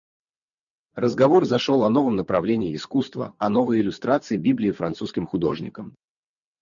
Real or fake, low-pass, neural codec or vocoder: real; 7.2 kHz; none